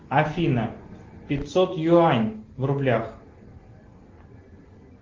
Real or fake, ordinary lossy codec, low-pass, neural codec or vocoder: real; Opus, 16 kbps; 7.2 kHz; none